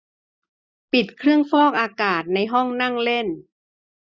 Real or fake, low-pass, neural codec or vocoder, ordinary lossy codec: real; none; none; none